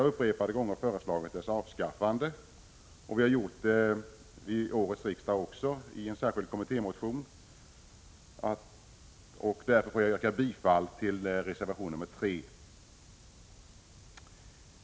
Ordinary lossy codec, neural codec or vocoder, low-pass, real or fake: none; none; none; real